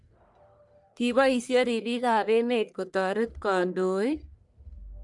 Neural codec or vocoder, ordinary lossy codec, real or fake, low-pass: codec, 44.1 kHz, 1.7 kbps, Pupu-Codec; none; fake; 10.8 kHz